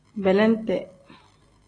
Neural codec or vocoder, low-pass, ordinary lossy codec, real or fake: none; 9.9 kHz; AAC, 32 kbps; real